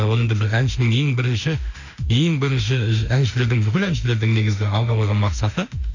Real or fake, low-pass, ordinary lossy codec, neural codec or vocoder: fake; 7.2 kHz; none; autoencoder, 48 kHz, 32 numbers a frame, DAC-VAE, trained on Japanese speech